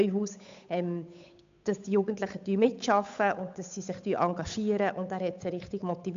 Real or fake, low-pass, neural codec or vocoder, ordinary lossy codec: fake; 7.2 kHz; codec, 16 kHz, 8 kbps, FunCodec, trained on Chinese and English, 25 frames a second; MP3, 64 kbps